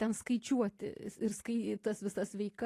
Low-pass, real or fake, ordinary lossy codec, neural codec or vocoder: 14.4 kHz; real; AAC, 48 kbps; none